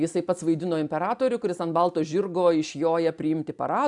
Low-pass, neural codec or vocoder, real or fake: 10.8 kHz; none; real